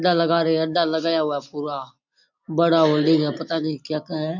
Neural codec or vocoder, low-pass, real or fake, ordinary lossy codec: none; 7.2 kHz; real; none